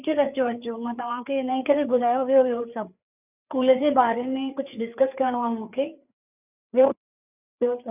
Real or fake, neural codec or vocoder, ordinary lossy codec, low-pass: fake; codec, 16 kHz, 4 kbps, FreqCodec, larger model; none; 3.6 kHz